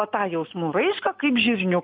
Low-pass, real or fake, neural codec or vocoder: 5.4 kHz; real; none